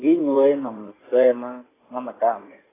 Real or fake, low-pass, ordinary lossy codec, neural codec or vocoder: fake; 3.6 kHz; AAC, 16 kbps; codec, 16 kHz in and 24 kHz out, 2.2 kbps, FireRedTTS-2 codec